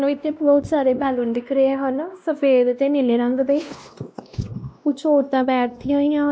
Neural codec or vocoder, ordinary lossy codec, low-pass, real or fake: codec, 16 kHz, 1 kbps, X-Codec, WavLM features, trained on Multilingual LibriSpeech; none; none; fake